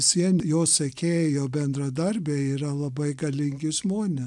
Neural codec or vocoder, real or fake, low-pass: none; real; 10.8 kHz